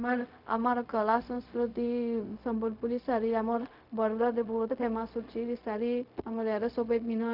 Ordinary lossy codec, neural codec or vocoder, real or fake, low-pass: none; codec, 16 kHz, 0.4 kbps, LongCat-Audio-Codec; fake; 5.4 kHz